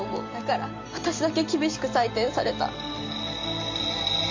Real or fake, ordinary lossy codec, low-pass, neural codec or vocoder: real; MP3, 64 kbps; 7.2 kHz; none